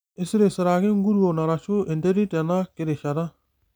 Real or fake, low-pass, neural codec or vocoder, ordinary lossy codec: real; none; none; none